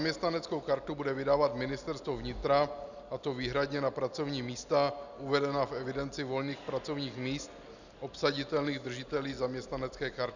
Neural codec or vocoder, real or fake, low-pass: none; real; 7.2 kHz